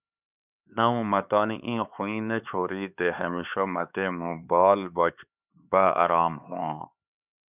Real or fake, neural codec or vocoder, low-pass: fake; codec, 16 kHz, 4 kbps, X-Codec, HuBERT features, trained on LibriSpeech; 3.6 kHz